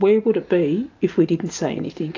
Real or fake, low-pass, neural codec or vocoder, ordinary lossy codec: real; 7.2 kHz; none; AAC, 48 kbps